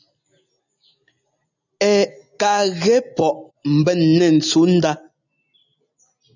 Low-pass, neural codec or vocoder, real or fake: 7.2 kHz; none; real